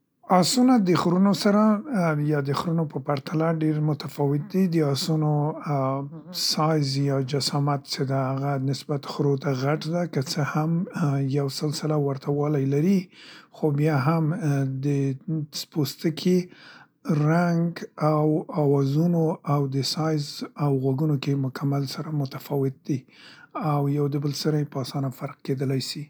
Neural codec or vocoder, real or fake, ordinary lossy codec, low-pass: none; real; none; none